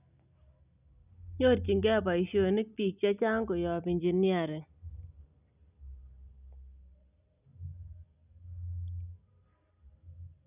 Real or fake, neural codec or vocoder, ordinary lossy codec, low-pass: real; none; none; 3.6 kHz